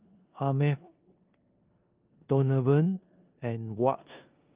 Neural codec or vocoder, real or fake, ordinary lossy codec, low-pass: codec, 16 kHz in and 24 kHz out, 0.9 kbps, LongCat-Audio-Codec, four codebook decoder; fake; Opus, 24 kbps; 3.6 kHz